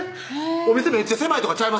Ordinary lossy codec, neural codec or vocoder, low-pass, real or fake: none; none; none; real